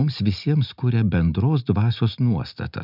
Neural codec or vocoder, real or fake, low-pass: none; real; 5.4 kHz